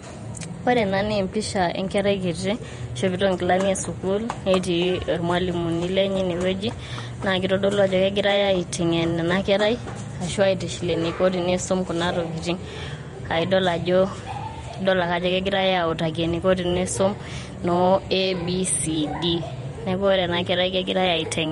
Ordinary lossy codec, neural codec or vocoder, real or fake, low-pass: MP3, 48 kbps; vocoder, 48 kHz, 128 mel bands, Vocos; fake; 19.8 kHz